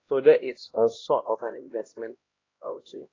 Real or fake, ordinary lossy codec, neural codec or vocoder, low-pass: fake; AAC, 32 kbps; codec, 16 kHz, 1 kbps, X-Codec, HuBERT features, trained on LibriSpeech; 7.2 kHz